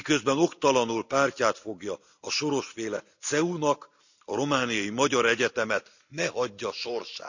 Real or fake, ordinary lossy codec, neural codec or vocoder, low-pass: real; none; none; 7.2 kHz